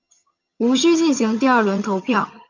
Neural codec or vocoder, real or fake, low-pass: vocoder, 22.05 kHz, 80 mel bands, HiFi-GAN; fake; 7.2 kHz